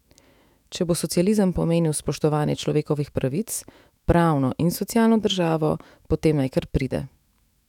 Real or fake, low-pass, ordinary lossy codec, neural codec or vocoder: fake; 19.8 kHz; none; autoencoder, 48 kHz, 128 numbers a frame, DAC-VAE, trained on Japanese speech